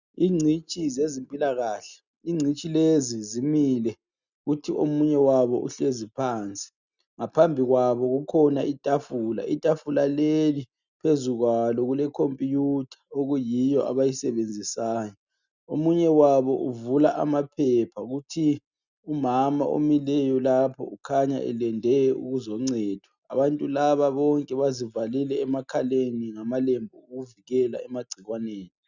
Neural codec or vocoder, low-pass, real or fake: none; 7.2 kHz; real